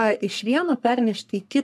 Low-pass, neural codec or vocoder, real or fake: 14.4 kHz; codec, 44.1 kHz, 3.4 kbps, Pupu-Codec; fake